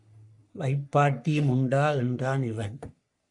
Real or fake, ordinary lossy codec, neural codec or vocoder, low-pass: fake; MP3, 96 kbps; codec, 44.1 kHz, 3.4 kbps, Pupu-Codec; 10.8 kHz